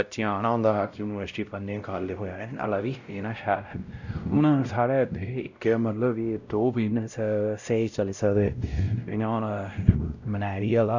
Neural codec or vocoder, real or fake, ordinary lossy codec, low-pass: codec, 16 kHz, 0.5 kbps, X-Codec, WavLM features, trained on Multilingual LibriSpeech; fake; none; 7.2 kHz